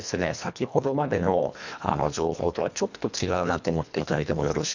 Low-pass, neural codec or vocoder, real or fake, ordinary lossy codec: 7.2 kHz; codec, 24 kHz, 1.5 kbps, HILCodec; fake; none